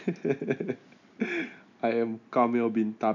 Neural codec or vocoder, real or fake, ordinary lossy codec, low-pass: none; real; none; 7.2 kHz